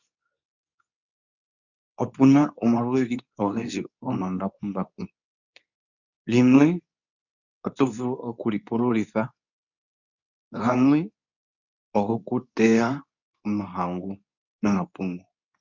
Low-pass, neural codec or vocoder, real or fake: 7.2 kHz; codec, 24 kHz, 0.9 kbps, WavTokenizer, medium speech release version 2; fake